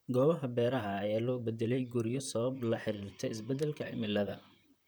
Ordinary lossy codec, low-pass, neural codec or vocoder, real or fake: none; none; vocoder, 44.1 kHz, 128 mel bands, Pupu-Vocoder; fake